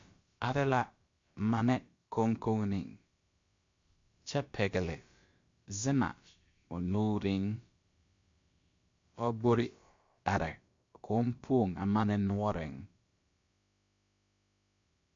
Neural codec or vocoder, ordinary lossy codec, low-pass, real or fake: codec, 16 kHz, about 1 kbps, DyCAST, with the encoder's durations; MP3, 48 kbps; 7.2 kHz; fake